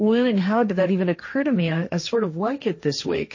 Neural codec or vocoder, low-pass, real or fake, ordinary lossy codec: codec, 16 kHz, 1.1 kbps, Voila-Tokenizer; 7.2 kHz; fake; MP3, 32 kbps